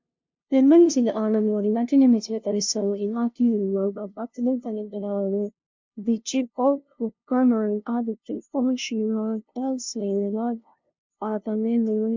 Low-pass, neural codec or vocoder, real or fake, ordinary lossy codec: 7.2 kHz; codec, 16 kHz, 0.5 kbps, FunCodec, trained on LibriTTS, 25 frames a second; fake; MP3, 64 kbps